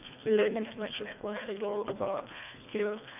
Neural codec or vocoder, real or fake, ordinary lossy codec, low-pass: codec, 24 kHz, 1.5 kbps, HILCodec; fake; none; 3.6 kHz